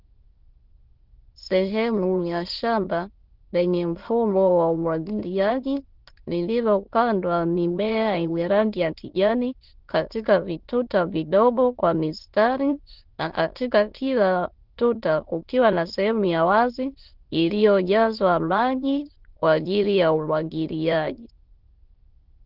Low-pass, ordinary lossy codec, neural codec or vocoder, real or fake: 5.4 kHz; Opus, 16 kbps; autoencoder, 22.05 kHz, a latent of 192 numbers a frame, VITS, trained on many speakers; fake